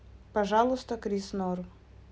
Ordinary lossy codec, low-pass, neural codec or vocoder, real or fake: none; none; none; real